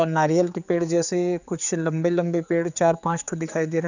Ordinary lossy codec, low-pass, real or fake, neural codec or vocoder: none; 7.2 kHz; fake; codec, 16 kHz, 4 kbps, X-Codec, HuBERT features, trained on general audio